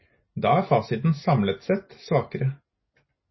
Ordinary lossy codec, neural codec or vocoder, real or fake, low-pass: MP3, 24 kbps; none; real; 7.2 kHz